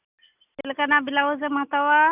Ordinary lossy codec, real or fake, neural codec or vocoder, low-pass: none; real; none; 3.6 kHz